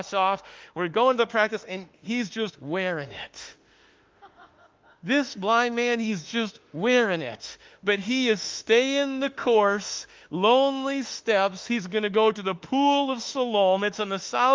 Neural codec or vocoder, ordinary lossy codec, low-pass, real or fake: autoencoder, 48 kHz, 32 numbers a frame, DAC-VAE, trained on Japanese speech; Opus, 32 kbps; 7.2 kHz; fake